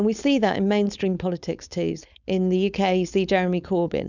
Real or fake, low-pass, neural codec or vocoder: fake; 7.2 kHz; codec, 16 kHz, 4.8 kbps, FACodec